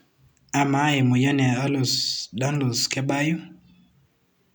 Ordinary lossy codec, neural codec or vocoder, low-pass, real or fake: none; none; none; real